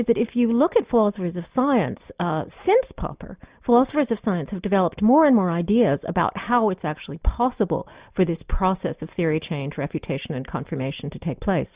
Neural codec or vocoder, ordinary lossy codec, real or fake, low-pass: none; Opus, 64 kbps; real; 3.6 kHz